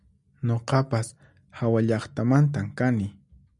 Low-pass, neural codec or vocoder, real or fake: 10.8 kHz; none; real